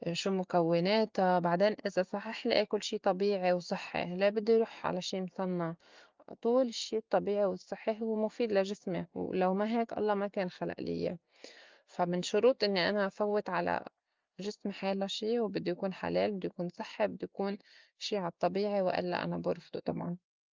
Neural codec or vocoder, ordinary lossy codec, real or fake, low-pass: none; Opus, 32 kbps; real; 7.2 kHz